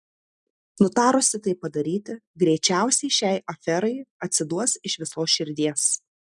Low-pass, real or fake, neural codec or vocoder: 10.8 kHz; real; none